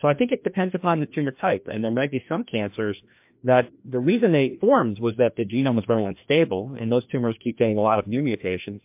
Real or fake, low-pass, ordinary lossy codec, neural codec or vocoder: fake; 3.6 kHz; MP3, 32 kbps; codec, 16 kHz, 1 kbps, FreqCodec, larger model